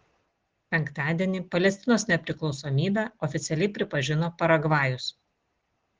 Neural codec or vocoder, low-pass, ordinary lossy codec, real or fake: none; 7.2 kHz; Opus, 16 kbps; real